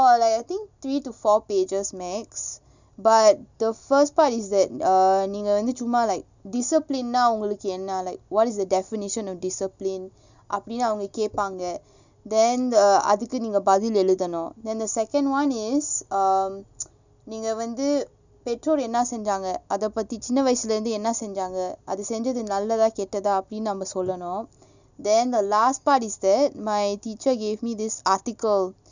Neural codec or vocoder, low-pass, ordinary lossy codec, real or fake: none; 7.2 kHz; none; real